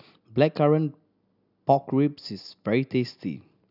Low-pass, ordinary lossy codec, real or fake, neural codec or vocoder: 5.4 kHz; none; real; none